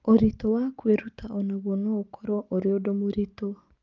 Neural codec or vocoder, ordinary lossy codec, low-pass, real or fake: none; Opus, 24 kbps; 7.2 kHz; real